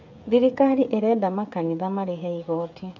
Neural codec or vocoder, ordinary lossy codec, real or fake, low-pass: codec, 16 kHz, 16 kbps, FreqCodec, smaller model; MP3, 48 kbps; fake; 7.2 kHz